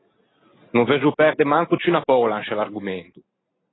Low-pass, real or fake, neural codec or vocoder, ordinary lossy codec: 7.2 kHz; real; none; AAC, 16 kbps